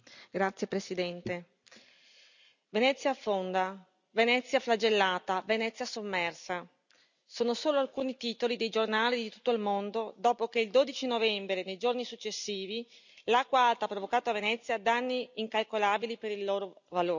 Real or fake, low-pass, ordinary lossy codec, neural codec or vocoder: real; 7.2 kHz; none; none